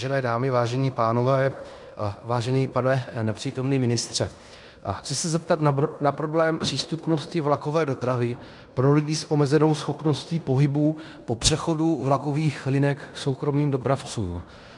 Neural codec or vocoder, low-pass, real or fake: codec, 16 kHz in and 24 kHz out, 0.9 kbps, LongCat-Audio-Codec, fine tuned four codebook decoder; 10.8 kHz; fake